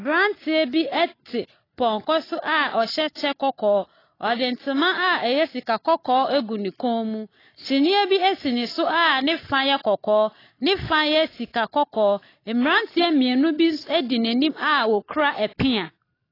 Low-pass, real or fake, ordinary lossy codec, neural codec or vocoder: 5.4 kHz; real; AAC, 24 kbps; none